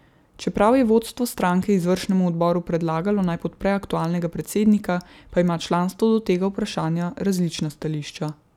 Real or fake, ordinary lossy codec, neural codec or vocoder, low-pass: real; none; none; 19.8 kHz